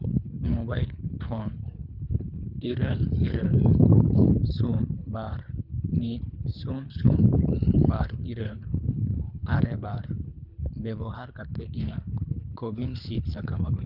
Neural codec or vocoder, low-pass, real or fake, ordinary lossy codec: codec, 24 kHz, 3 kbps, HILCodec; 5.4 kHz; fake; none